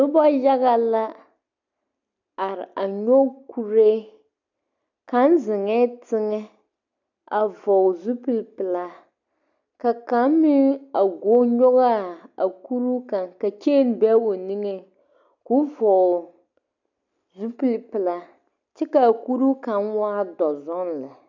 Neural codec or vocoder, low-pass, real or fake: none; 7.2 kHz; real